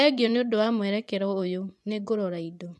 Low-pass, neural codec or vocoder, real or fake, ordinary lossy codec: none; vocoder, 24 kHz, 100 mel bands, Vocos; fake; none